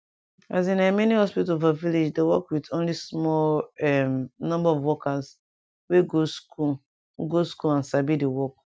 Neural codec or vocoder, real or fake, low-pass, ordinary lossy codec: none; real; none; none